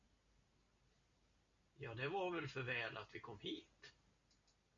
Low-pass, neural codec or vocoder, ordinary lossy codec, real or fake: 7.2 kHz; none; MP3, 64 kbps; real